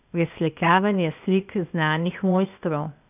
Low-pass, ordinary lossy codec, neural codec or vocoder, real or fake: 3.6 kHz; none; codec, 16 kHz, 0.8 kbps, ZipCodec; fake